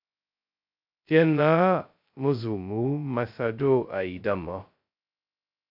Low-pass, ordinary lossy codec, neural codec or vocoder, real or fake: 5.4 kHz; AAC, 48 kbps; codec, 16 kHz, 0.2 kbps, FocalCodec; fake